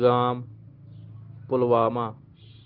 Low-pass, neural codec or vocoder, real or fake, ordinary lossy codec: 5.4 kHz; none; real; Opus, 16 kbps